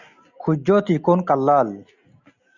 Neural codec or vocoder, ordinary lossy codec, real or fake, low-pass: none; Opus, 64 kbps; real; 7.2 kHz